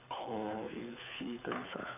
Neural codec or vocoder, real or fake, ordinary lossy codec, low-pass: codec, 24 kHz, 6 kbps, HILCodec; fake; none; 3.6 kHz